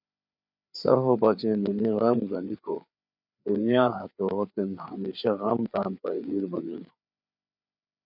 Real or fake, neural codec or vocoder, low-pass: fake; codec, 16 kHz, 4 kbps, FreqCodec, larger model; 5.4 kHz